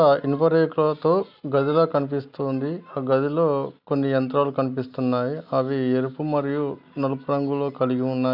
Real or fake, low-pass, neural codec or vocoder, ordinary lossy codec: real; 5.4 kHz; none; none